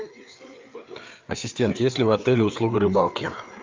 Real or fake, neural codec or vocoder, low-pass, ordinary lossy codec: fake; codec, 16 kHz, 4 kbps, FreqCodec, larger model; 7.2 kHz; Opus, 24 kbps